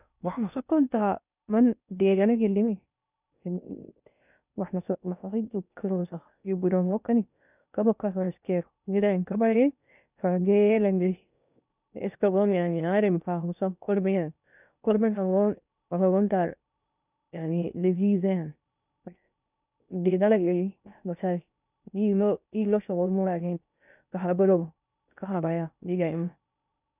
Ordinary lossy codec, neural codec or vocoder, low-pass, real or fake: none; codec, 16 kHz in and 24 kHz out, 0.6 kbps, FocalCodec, streaming, 2048 codes; 3.6 kHz; fake